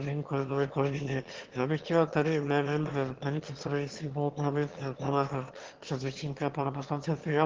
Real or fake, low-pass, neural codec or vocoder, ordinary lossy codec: fake; 7.2 kHz; autoencoder, 22.05 kHz, a latent of 192 numbers a frame, VITS, trained on one speaker; Opus, 16 kbps